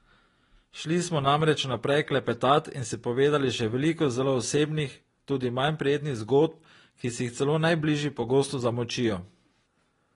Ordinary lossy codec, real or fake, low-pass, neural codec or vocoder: AAC, 32 kbps; real; 10.8 kHz; none